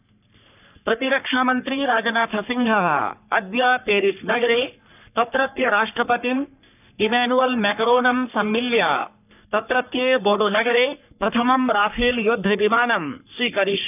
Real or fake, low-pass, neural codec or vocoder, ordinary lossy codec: fake; 3.6 kHz; codec, 44.1 kHz, 3.4 kbps, Pupu-Codec; none